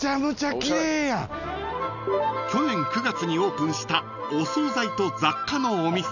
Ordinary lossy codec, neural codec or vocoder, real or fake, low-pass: none; none; real; 7.2 kHz